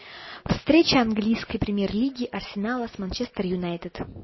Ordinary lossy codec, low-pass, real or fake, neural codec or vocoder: MP3, 24 kbps; 7.2 kHz; real; none